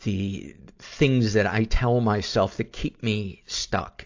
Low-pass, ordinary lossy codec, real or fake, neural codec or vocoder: 7.2 kHz; AAC, 48 kbps; real; none